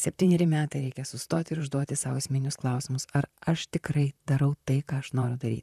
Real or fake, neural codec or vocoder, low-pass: fake; vocoder, 44.1 kHz, 128 mel bands, Pupu-Vocoder; 14.4 kHz